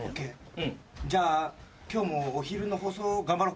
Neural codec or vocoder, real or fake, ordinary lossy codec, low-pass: none; real; none; none